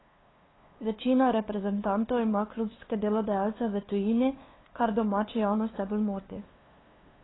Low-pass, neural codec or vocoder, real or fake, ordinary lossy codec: 7.2 kHz; codec, 16 kHz, 2 kbps, FunCodec, trained on LibriTTS, 25 frames a second; fake; AAC, 16 kbps